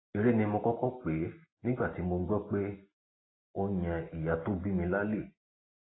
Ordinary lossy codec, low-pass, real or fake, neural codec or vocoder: AAC, 16 kbps; 7.2 kHz; real; none